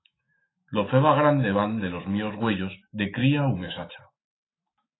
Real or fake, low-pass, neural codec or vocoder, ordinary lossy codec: fake; 7.2 kHz; autoencoder, 48 kHz, 128 numbers a frame, DAC-VAE, trained on Japanese speech; AAC, 16 kbps